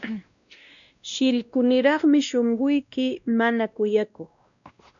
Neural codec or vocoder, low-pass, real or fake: codec, 16 kHz, 1 kbps, X-Codec, WavLM features, trained on Multilingual LibriSpeech; 7.2 kHz; fake